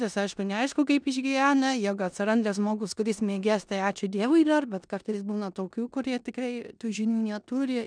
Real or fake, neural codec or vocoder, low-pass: fake; codec, 16 kHz in and 24 kHz out, 0.9 kbps, LongCat-Audio-Codec, four codebook decoder; 9.9 kHz